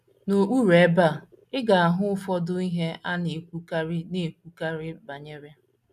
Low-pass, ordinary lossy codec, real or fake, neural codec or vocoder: 14.4 kHz; none; real; none